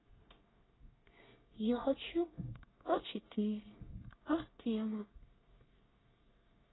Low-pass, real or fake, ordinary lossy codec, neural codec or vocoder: 7.2 kHz; fake; AAC, 16 kbps; codec, 24 kHz, 1 kbps, SNAC